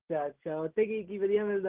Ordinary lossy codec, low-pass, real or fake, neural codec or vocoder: Opus, 16 kbps; 3.6 kHz; real; none